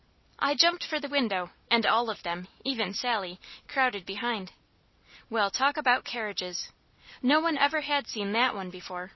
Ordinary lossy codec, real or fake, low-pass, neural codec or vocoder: MP3, 24 kbps; real; 7.2 kHz; none